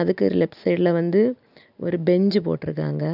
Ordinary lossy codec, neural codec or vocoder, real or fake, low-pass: none; none; real; 5.4 kHz